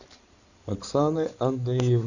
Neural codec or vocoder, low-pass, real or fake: codec, 16 kHz in and 24 kHz out, 2.2 kbps, FireRedTTS-2 codec; 7.2 kHz; fake